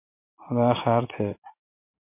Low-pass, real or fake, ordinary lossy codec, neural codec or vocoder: 3.6 kHz; real; MP3, 24 kbps; none